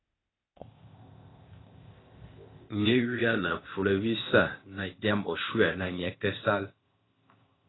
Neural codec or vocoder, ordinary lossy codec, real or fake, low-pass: codec, 16 kHz, 0.8 kbps, ZipCodec; AAC, 16 kbps; fake; 7.2 kHz